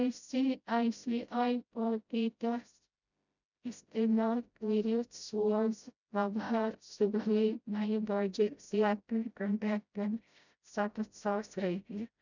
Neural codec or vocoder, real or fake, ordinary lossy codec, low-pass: codec, 16 kHz, 0.5 kbps, FreqCodec, smaller model; fake; none; 7.2 kHz